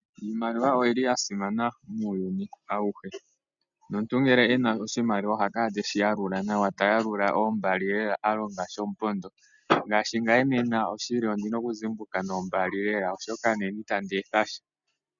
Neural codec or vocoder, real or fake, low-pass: none; real; 7.2 kHz